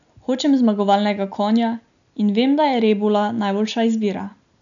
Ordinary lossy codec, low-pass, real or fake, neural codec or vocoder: none; 7.2 kHz; real; none